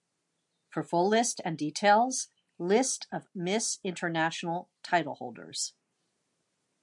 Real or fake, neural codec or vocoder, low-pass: real; none; 10.8 kHz